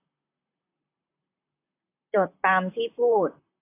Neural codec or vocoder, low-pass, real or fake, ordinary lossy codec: vocoder, 44.1 kHz, 128 mel bands, Pupu-Vocoder; 3.6 kHz; fake; AAC, 24 kbps